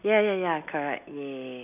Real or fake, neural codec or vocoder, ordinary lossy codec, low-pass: real; none; none; 3.6 kHz